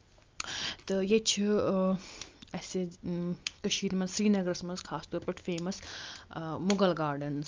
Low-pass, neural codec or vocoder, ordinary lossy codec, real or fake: 7.2 kHz; none; Opus, 24 kbps; real